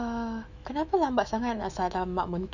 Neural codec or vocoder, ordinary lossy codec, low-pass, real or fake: autoencoder, 48 kHz, 128 numbers a frame, DAC-VAE, trained on Japanese speech; none; 7.2 kHz; fake